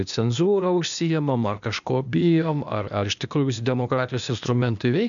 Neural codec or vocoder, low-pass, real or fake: codec, 16 kHz, 0.8 kbps, ZipCodec; 7.2 kHz; fake